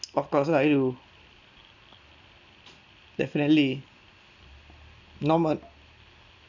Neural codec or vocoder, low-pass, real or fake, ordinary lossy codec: none; 7.2 kHz; real; none